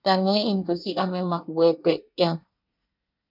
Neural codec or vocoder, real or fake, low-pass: codec, 24 kHz, 1 kbps, SNAC; fake; 5.4 kHz